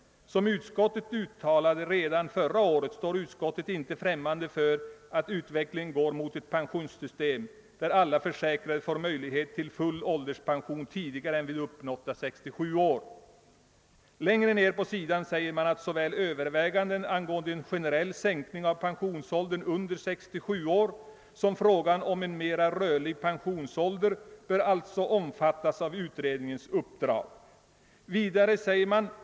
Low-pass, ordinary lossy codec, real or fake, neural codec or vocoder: none; none; real; none